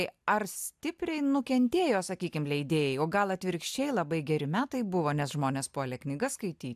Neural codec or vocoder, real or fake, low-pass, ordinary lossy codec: none; real; 14.4 kHz; AAC, 96 kbps